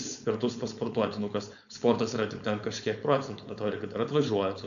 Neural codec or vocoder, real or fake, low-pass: codec, 16 kHz, 4.8 kbps, FACodec; fake; 7.2 kHz